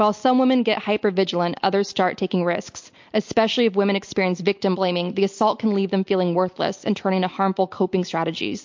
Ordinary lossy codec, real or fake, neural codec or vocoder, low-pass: MP3, 48 kbps; real; none; 7.2 kHz